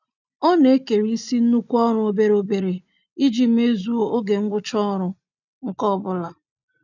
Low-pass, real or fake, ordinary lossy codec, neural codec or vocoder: 7.2 kHz; real; none; none